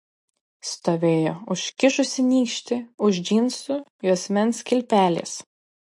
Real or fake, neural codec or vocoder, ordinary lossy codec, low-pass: real; none; MP3, 48 kbps; 10.8 kHz